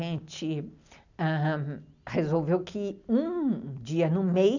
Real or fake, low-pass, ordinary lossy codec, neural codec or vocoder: real; 7.2 kHz; none; none